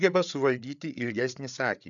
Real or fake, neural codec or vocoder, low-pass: fake; codec, 16 kHz, 8 kbps, FreqCodec, larger model; 7.2 kHz